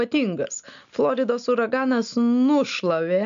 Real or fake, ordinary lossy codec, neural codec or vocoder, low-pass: real; AAC, 96 kbps; none; 7.2 kHz